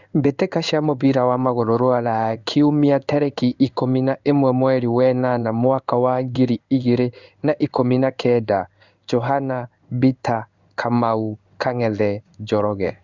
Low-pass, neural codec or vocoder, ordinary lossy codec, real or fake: 7.2 kHz; codec, 16 kHz in and 24 kHz out, 1 kbps, XY-Tokenizer; Opus, 64 kbps; fake